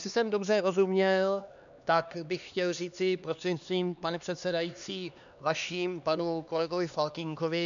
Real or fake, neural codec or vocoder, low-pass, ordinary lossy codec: fake; codec, 16 kHz, 2 kbps, X-Codec, HuBERT features, trained on LibriSpeech; 7.2 kHz; MP3, 96 kbps